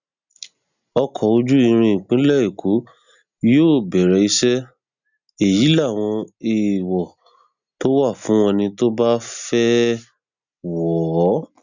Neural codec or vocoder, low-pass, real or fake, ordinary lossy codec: none; 7.2 kHz; real; none